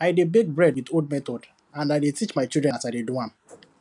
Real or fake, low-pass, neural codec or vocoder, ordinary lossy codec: real; 10.8 kHz; none; none